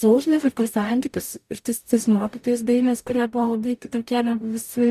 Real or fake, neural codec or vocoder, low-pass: fake; codec, 44.1 kHz, 0.9 kbps, DAC; 14.4 kHz